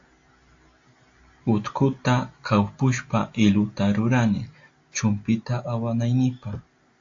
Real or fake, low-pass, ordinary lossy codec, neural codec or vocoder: real; 7.2 kHz; AAC, 48 kbps; none